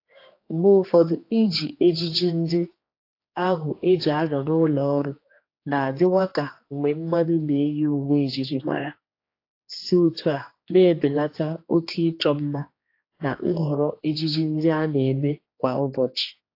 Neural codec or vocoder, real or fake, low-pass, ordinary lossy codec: codec, 16 kHz, 2 kbps, X-Codec, HuBERT features, trained on general audio; fake; 5.4 kHz; AAC, 32 kbps